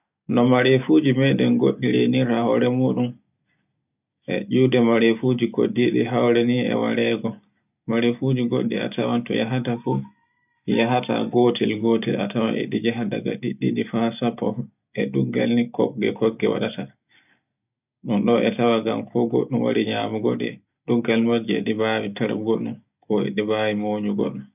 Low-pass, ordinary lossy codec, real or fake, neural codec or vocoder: 3.6 kHz; none; real; none